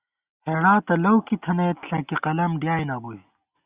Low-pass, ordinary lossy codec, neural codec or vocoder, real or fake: 3.6 kHz; Opus, 64 kbps; none; real